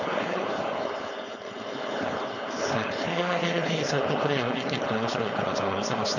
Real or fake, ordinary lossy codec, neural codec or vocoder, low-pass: fake; none; codec, 16 kHz, 4.8 kbps, FACodec; 7.2 kHz